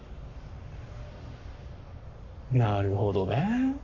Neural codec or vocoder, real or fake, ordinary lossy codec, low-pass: codec, 44.1 kHz, 7.8 kbps, Pupu-Codec; fake; AAC, 48 kbps; 7.2 kHz